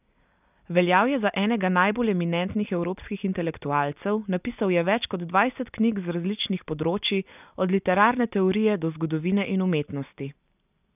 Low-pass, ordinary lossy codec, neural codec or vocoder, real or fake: 3.6 kHz; none; none; real